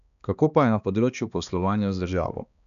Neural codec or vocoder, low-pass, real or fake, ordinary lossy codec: codec, 16 kHz, 2 kbps, X-Codec, HuBERT features, trained on balanced general audio; 7.2 kHz; fake; none